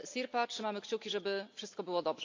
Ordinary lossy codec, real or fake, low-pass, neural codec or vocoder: AAC, 48 kbps; real; 7.2 kHz; none